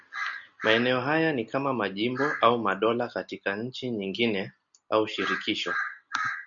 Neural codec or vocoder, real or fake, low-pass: none; real; 7.2 kHz